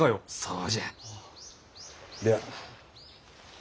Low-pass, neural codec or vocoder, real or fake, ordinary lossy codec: none; none; real; none